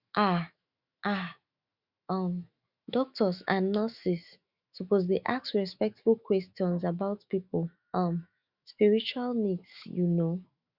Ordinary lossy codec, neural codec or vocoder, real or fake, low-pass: Opus, 64 kbps; autoencoder, 48 kHz, 128 numbers a frame, DAC-VAE, trained on Japanese speech; fake; 5.4 kHz